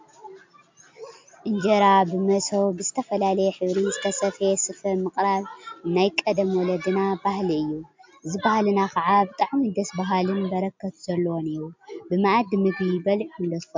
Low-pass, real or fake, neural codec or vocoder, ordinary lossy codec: 7.2 kHz; real; none; MP3, 64 kbps